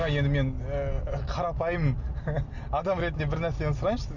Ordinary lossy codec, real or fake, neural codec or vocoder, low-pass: none; real; none; 7.2 kHz